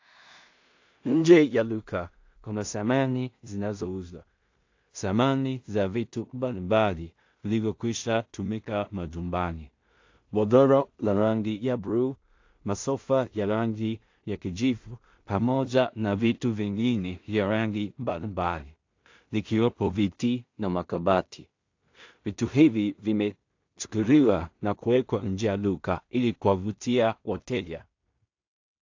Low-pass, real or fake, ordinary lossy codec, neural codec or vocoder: 7.2 kHz; fake; AAC, 48 kbps; codec, 16 kHz in and 24 kHz out, 0.4 kbps, LongCat-Audio-Codec, two codebook decoder